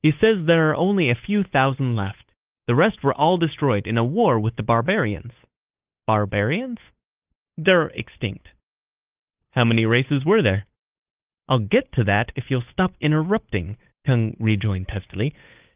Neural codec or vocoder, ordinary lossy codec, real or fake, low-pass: codec, 16 kHz, 8 kbps, FunCodec, trained on Chinese and English, 25 frames a second; Opus, 32 kbps; fake; 3.6 kHz